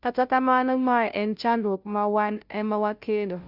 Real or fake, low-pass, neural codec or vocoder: fake; 5.4 kHz; codec, 16 kHz, 0.5 kbps, FunCodec, trained on Chinese and English, 25 frames a second